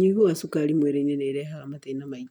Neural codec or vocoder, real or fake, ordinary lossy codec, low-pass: none; real; none; 19.8 kHz